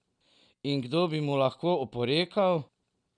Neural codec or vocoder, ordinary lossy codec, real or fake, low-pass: none; none; real; 9.9 kHz